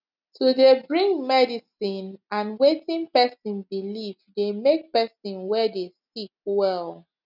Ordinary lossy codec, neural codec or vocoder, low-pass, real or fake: none; none; 5.4 kHz; real